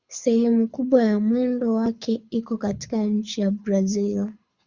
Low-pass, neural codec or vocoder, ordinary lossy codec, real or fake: 7.2 kHz; codec, 24 kHz, 6 kbps, HILCodec; Opus, 64 kbps; fake